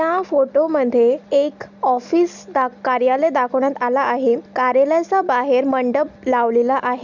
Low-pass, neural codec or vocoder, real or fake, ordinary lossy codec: 7.2 kHz; none; real; none